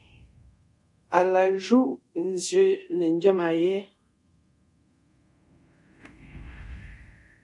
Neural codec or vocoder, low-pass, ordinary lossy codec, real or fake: codec, 24 kHz, 0.5 kbps, DualCodec; 10.8 kHz; MP3, 48 kbps; fake